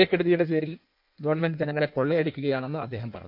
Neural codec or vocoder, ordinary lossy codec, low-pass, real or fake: codec, 16 kHz in and 24 kHz out, 1.1 kbps, FireRedTTS-2 codec; none; 5.4 kHz; fake